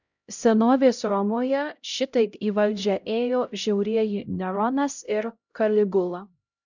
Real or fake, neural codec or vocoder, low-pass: fake; codec, 16 kHz, 0.5 kbps, X-Codec, HuBERT features, trained on LibriSpeech; 7.2 kHz